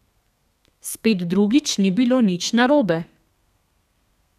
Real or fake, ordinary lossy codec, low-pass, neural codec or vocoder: fake; none; 14.4 kHz; codec, 32 kHz, 1.9 kbps, SNAC